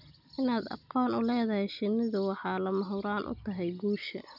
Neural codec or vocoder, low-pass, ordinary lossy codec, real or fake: none; 5.4 kHz; none; real